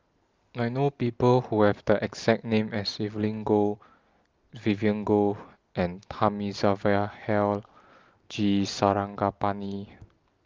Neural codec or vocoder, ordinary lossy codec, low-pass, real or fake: none; Opus, 32 kbps; 7.2 kHz; real